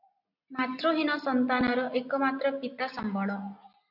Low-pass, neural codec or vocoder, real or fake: 5.4 kHz; none; real